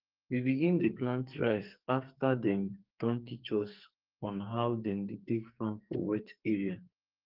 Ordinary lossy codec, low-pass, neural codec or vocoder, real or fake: Opus, 32 kbps; 5.4 kHz; codec, 44.1 kHz, 2.6 kbps, SNAC; fake